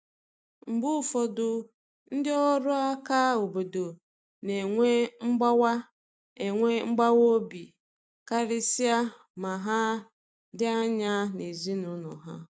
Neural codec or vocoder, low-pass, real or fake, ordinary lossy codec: none; none; real; none